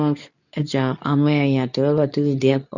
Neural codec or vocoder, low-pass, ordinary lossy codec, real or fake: codec, 24 kHz, 0.9 kbps, WavTokenizer, medium speech release version 2; 7.2 kHz; none; fake